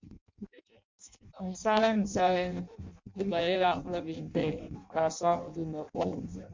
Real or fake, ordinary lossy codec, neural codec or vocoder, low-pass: fake; MP3, 48 kbps; codec, 16 kHz in and 24 kHz out, 0.6 kbps, FireRedTTS-2 codec; 7.2 kHz